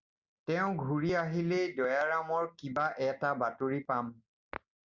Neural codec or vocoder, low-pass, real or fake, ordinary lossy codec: none; 7.2 kHz; real; Opus, 64 kbps